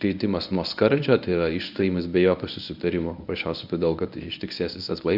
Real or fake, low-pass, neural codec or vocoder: fake; 5.4 kHz; codec, 24 kHz, 0.9 kbps, WavTokenizer, medium speech release version 1